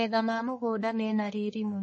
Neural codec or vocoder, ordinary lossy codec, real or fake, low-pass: codec, 44.1 kHz, 1.7 kbps, Pupu-Codec; MP3, 32 kbps; fake; 10.8 kHz